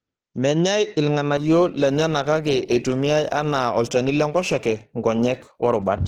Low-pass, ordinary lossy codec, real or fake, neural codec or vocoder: 19.8 kHz; Opus, 16 kbps; fake; autoencoder, 48 kHz, 32 numbers a frame, DAC-VAE, trained on Japanese speech